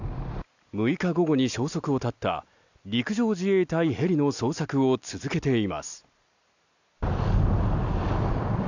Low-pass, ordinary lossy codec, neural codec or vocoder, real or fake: 7.2 kHz; none; none; real